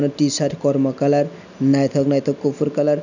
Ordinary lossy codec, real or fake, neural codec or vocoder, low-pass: none; real; none; 7.2 kHz